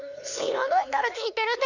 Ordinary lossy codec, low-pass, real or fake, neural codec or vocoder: none; 7.2 kHz; fake; codec, 16 kHz, 2 kbps, X-Codec, HuBERT features, trained on LibriSpeech